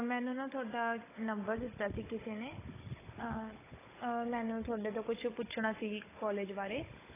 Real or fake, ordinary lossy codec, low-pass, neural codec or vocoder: fake; AAC, 16 kbps; 3.6 kHz; codec, 16 kHz, 16 kbps, FunCodec, trained on Chinese and English, 50 frames a second